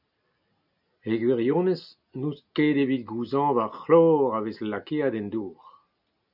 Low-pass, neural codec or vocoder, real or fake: 5.4 kHz; none; real